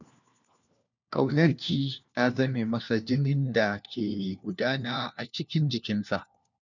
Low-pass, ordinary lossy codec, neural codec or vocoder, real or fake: 7.2 kHz; none; codec, 16 kHz, 1 kbps, FunCodec, trained on LibriTTS, 50 frames a second; fake